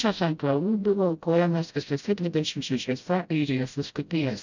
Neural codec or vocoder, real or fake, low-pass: codec, 16 kHz, 0.5 kbps, FreqCodec, smaller model; fake; 7.2 kHz